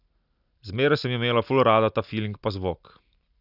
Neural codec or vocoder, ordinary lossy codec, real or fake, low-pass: none; none; real; 5.4 kHz